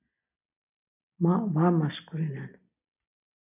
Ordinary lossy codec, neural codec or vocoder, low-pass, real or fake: MP3, 32 kbps; none; 3.6 kHz; real